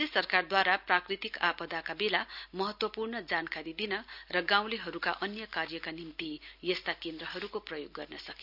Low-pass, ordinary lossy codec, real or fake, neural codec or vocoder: 5.4 kHz; none; real; none